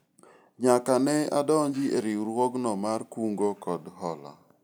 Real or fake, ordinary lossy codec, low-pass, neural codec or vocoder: real; none; none; none